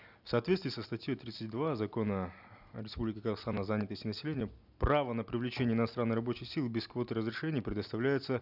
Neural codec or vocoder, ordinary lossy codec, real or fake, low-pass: none; none; real; 5.4 kHz